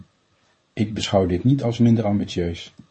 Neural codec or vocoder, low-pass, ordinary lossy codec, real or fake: codec, 24 kHz, 0.9 kbps, WavTokenizer, medium speech release version 1; 10.8 kHz; MP3, 32 kbps; fake